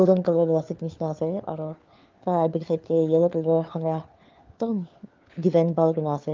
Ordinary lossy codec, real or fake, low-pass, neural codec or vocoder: Opus, 32 kbps; fake; 7.2 kHz; codec, 16 kHz, 4 kbps, FunCodec, trained on LibriTTS, 50 frames a second